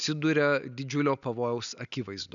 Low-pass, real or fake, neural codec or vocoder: 7.2 kHz; real; none